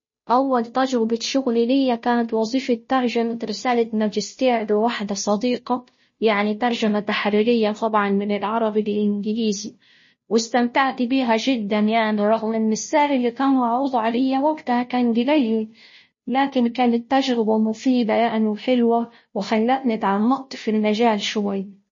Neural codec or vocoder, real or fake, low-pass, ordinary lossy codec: codec, 16 kHz, 0.5 kbps, FunCodec, trained on Chinese and English, 25 frames a second; fake; 7.2 kHz; MP3, 32 kbps